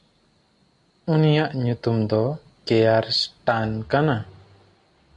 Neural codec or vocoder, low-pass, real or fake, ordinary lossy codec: none; 10.8 kHz; real; MP3, 64 kbps